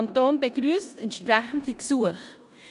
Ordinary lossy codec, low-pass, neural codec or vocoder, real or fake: none; 10.8 kHz; codec, 16 kHz in and 24 kHz out, 0.9 kbps, LongCat-Audio-Codec, four codebook decoder; fake